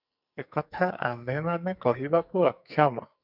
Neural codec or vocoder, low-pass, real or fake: codec, 44.1 kHz, 2.6 kbps, SNAC; 5.4 kHz; fake